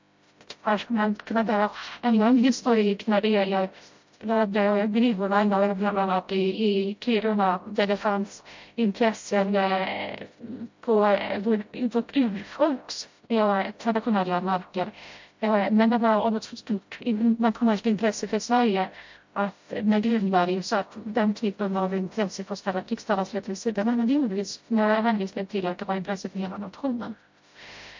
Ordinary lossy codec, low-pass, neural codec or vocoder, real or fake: MP3, 48 kbps; 7.2 kHz; codec, 16 kHz, 0.5 kbps, FreqCodec, smaller model; fake